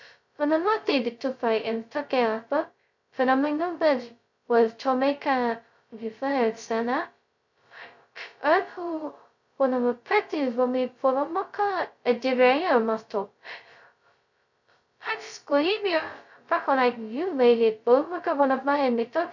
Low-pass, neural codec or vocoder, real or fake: 7.2 kHz; codec, 16 kHz, 0.2 kbps, FocalCodec; fake